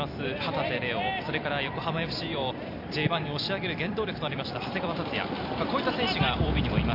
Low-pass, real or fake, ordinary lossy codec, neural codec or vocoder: 5.4 kHz; fake; AAC, 48 kbps; vocoder, 44.1 kHz, 128 mel bands every 256 samples, BigVGAN v2